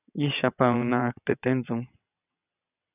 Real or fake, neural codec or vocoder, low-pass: fake; vocoder, 22.05 kHz, 80 mel bands, WaveNeXt; 3.6 kHz